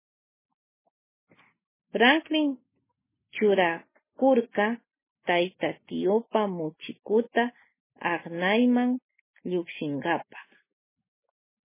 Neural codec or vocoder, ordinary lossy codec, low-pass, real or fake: none; MP3, 16 kbps; 3.6 kHz; real